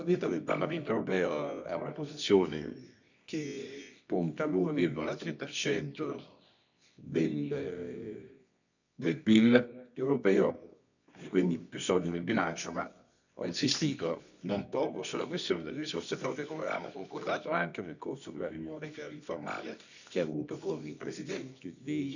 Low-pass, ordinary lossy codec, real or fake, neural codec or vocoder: 7.2 kHz; none; fake; codec, 24 kHz, 0.9 kbps, WavTokenizer, medium music audio release